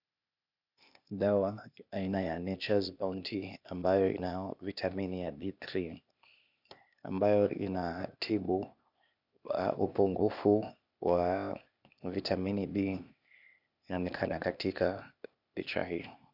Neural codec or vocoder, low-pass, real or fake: codec, 16 kHz, 0.8 kbps, ZipCodec; 5.4 kHz; fake